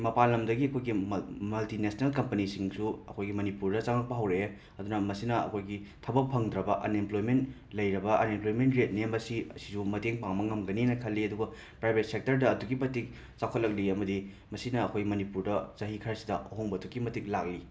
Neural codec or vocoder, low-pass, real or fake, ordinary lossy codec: none; none; real; none